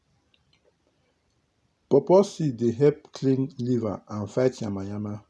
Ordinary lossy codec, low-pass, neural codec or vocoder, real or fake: none; none; none; real